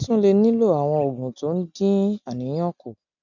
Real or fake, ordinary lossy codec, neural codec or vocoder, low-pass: real; none; none; 7.2 kHz